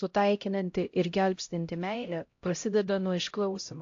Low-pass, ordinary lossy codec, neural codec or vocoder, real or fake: 7.2 kHz; AAC, 48 kbps; codec, 16 kHz, 0.5 kbps, X-Codec, HuBERT features, trained on LibriSpeech; fake